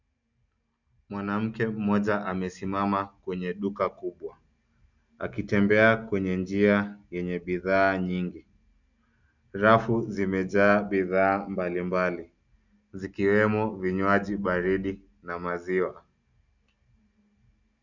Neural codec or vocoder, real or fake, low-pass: none; real; 7.2 kHz